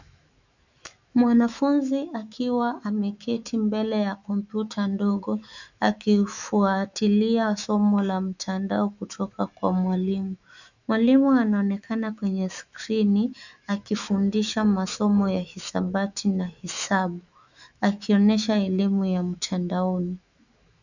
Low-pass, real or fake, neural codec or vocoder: 7.2 kHz; fake; autoencoder, 48 kHz, 128 numbers a frame, DAC-VAE, trained on Japanese speech